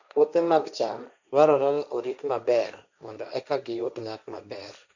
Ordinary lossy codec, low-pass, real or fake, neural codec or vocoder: none; 7.2 kHz; fake; codec, 16 kHz, 1.1 kbps, Voila-Tokenizer